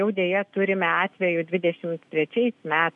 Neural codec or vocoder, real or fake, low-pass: none; real; 10.8 kHz